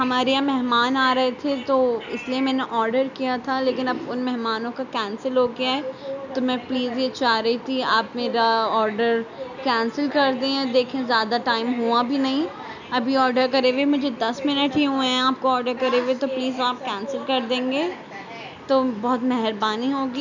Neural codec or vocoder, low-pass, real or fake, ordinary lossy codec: none; 7.2 kHz; real; none